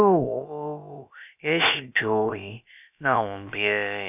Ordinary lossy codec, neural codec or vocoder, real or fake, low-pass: none; codec, 16 kHz, about 1 kbps, DyCAST, with the encoder's durations; fake; 3.6 kHz